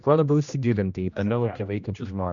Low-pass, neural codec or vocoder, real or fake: 7.2 kHz; codec, 16 kHz, 1 kbps, X-Codec, HuBERT features, trained on general audio; fake